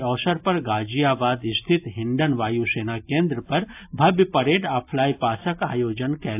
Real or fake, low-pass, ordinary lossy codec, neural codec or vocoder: real; 3.6 kHz; AAC, 32 kbps; none